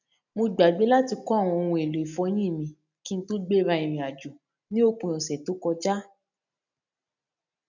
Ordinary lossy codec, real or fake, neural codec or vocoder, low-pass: none; real; none; 7.2 kHz